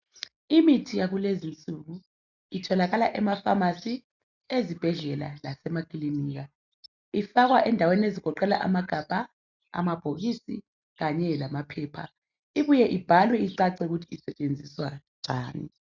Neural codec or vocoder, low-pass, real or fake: none; 7.2 kHz; real